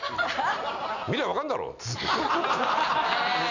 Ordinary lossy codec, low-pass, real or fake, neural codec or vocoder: none; 7.2 kHz; real; none